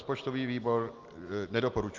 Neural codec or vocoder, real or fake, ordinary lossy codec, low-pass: none; real; Opus, 32 kbps; 7.2 kHz